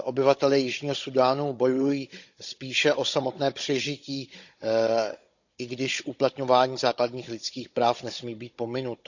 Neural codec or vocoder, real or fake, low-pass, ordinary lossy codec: codec, 16 kHz, 16 kbps, FunCodec, trained on Chinese and English, 50 frames a second; fake; 7.2 kHz; none